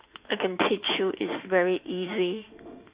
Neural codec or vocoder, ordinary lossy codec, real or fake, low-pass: autoencoder, 48 kHz, 32 numbers a frame, DAC-VAE, trained on Japanese speech; Opus, 64 kbps; fake; 3.6 kHz